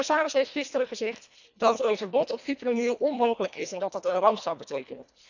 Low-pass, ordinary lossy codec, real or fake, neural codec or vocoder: 7.2 kHz; none; fake; codec, 24 kHz, 1.5 kbps, HILCodec